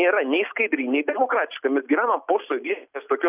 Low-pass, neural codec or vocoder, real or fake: 3.6 kHz; none; real